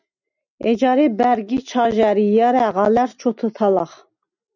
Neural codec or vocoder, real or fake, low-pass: none; real; 7.2 kHz